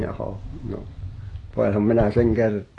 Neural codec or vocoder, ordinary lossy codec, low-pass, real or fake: none; AAC, 32 kbps; 10.8 kHz; real